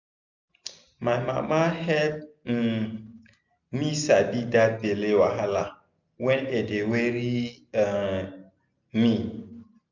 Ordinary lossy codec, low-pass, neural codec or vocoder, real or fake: none; 7.2 kHz; none; real